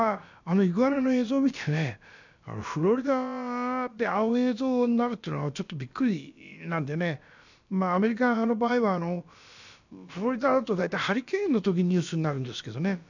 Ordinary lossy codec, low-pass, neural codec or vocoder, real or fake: none; 7.2 kHz; codec, 16 kHz, about 1 kbps, DyCAST, with the encoder's durations; fake